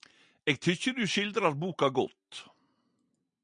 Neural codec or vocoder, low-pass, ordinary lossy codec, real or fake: none; 9.9 kHz; MP3, 96 kbps; real